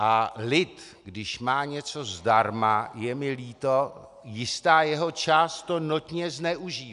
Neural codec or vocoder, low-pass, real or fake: none; 10.8 kHz; real